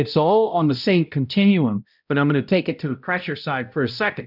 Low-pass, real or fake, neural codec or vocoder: 5.4 kHz; fake; codec, 16 kHz, 0.5 kbps, X-Codec, HuBERT features, trained on balanced general audio